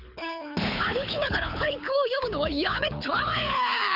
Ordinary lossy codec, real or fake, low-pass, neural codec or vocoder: none; fake; 5.4 kHz; codec, 24 kHz, 6 kbps, HILCodec